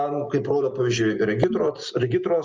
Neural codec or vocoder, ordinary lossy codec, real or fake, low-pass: none; Opus, 24 kbps; real; 7.2 kHz